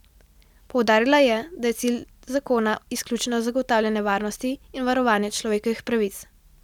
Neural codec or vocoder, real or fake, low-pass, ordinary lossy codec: none; real; 19.8 kHz; none